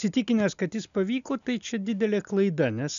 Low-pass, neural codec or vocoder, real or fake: 7.2 kHz; none; real